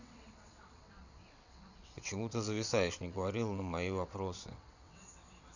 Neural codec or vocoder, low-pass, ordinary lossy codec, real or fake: vocoder, 22.05 kHz, 80 mel bands, WaveNeXt; 7.2 kHz; none; fake